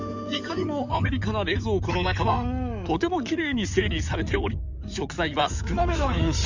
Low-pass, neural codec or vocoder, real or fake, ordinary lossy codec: 7.2 kHz; codec, 16 kHz in and 24 kHz out, 2.2 kbps, FireRedTTS-2 codec; fake; none